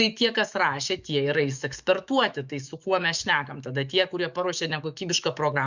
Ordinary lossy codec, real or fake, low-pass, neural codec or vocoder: Opus, 64 kbps; fake; 7.2 kHz; autoencoder, 48 kHz, 128 numbers a frame, DAC-VAE, trained on Japanese speech